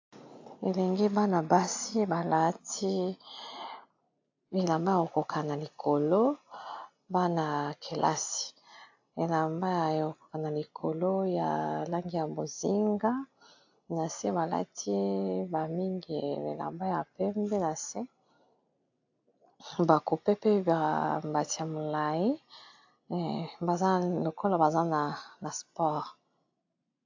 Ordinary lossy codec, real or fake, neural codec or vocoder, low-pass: AAC, 48 kbps; real; none; 7.2 kHz